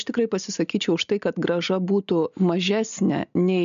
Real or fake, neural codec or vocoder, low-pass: real; none; 7.2 kHz